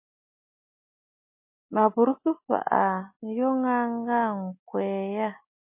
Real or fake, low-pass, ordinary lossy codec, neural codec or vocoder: real; 3.6 kHz; MP3, 24 kbps; none